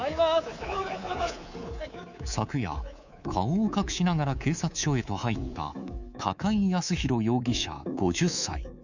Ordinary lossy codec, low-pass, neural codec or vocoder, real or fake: none; 7.2 kHz; codec, 24 kHz, 3.1 kbps, DualCodec; fake